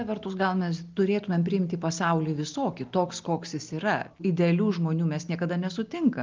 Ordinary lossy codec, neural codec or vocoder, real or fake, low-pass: Opus, 24 kbps; none; real; 7.2 kHz